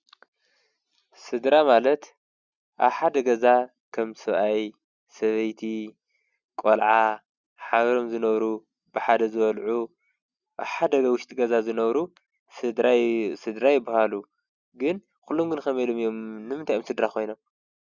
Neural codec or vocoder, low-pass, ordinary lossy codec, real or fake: none; 7.2 kHz; Opus, 64 kbps; real